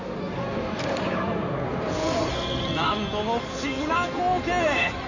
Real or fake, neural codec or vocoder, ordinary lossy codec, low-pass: fake; codec, 16 kHz in and 24 kHz out, 2.2 kbps, FireRedTTS-2 codec; none; 7.2 kHz